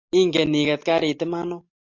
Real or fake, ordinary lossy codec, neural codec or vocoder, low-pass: real; AAC, 48 kbps; none; 7.2 kHz